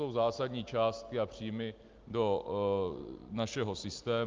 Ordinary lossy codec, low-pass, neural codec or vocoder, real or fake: Opus, 24 kbps; 7.2 kHz; none; real